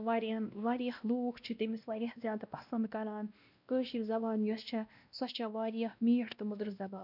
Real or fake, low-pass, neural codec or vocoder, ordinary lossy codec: fake; 5.4 kHz; codec, 16 kHz, 1 kbps, X-Codec, WavLM features, trained on Multilingual LibriSpeech; none